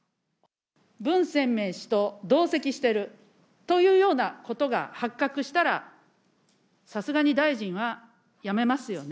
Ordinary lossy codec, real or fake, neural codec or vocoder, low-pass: none; real; none; none